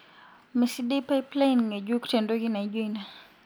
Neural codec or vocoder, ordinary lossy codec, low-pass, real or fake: none; none; none; real